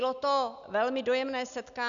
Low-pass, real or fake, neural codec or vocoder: 7.2 kHz; real; none